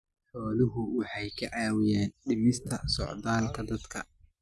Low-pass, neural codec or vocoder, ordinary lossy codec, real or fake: none; none; none; real